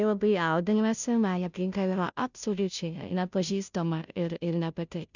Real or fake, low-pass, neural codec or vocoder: fake; 7.2 kHz; codec, 16 kHz, 0.5 kbps, FunCodec, trained on Chinese and English, 25 frames a second